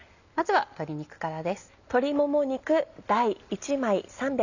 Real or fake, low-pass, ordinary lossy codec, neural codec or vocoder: real; 7.2 kHz; none; none